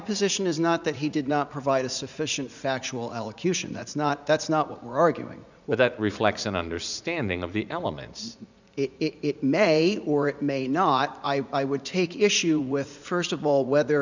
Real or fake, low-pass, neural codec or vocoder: fake; 7.2 kHz; vocoder, 44.1 kHz, 80 mel bands, Vocos